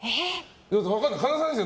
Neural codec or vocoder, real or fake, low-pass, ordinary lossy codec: none; real; none; none